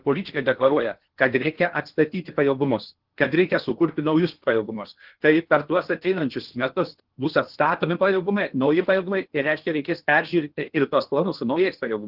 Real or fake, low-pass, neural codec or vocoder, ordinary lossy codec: fake; 5.4 kHz; codec, 16 kHz in and 24 kHz out, 0.8 kbps, FocalCodec, streaming, 65536 codes; Opus, 16 kbps